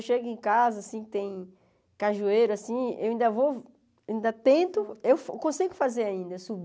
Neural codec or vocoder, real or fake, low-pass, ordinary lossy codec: none; real; none; none